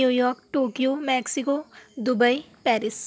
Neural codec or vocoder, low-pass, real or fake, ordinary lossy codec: none; none; real; none